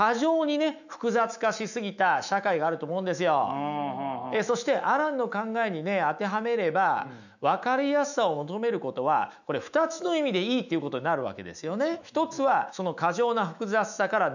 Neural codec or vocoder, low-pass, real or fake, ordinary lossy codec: autoencoder, 48 kHz, 128 numbers a frame, DAC-VAE, trained on Japanese speech; 7.2 kHz; fake; none